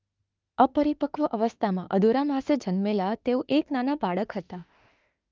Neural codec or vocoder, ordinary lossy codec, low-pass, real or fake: autoencoder, 48 kHz, 32 numbers a frame, DAC-VAE, trained on Japanese speech; Opus, 24 kbps; 7.2 kHz; fake